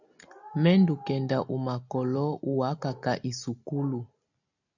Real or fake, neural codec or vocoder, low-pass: real; none; 7.2 kHz